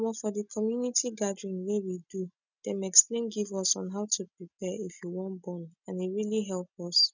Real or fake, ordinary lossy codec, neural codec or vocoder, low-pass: real; none; none; 7.2 kHz